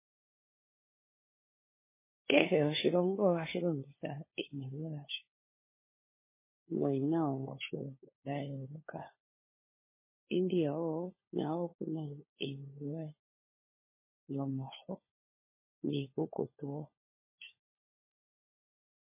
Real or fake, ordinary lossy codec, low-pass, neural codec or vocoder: fake; MP3, 16 kbps; 3.6 kHz; codec, 16 kHz, 4 kbps, FunCodec, trained on LibriTTS, 50 frames a second